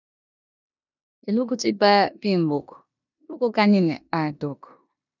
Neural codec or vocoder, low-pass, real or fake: codec, 16 kHz in and 24 kHz out, 0.9 kbps, LongCat-Audio-Codec, four codebook decoder; 7.2 kHz; fake